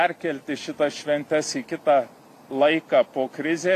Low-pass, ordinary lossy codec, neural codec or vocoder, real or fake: 14.4 kHz; AAC, 64 kbps; none; real